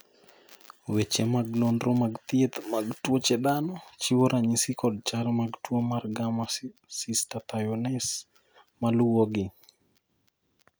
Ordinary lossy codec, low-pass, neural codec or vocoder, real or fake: none; none; none; real